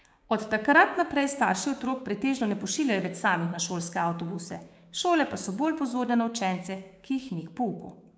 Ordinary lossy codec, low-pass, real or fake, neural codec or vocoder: none; none; fake; codec, 16 kHz, 6 kbps, DAC